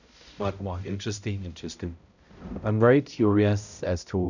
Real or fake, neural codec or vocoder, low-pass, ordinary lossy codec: fake; codec, 16 kHz, 0.5 kbps, X-Codec, HuBERT features, trained on balanced general audio; 7.2 kHz; none